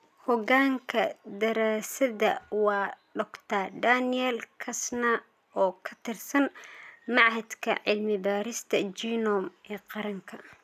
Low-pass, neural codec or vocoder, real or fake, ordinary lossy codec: 14.4 kHz; none; real; none